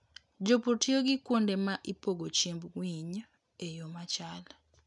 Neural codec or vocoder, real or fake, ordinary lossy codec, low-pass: none; real; none; 10.8 kHz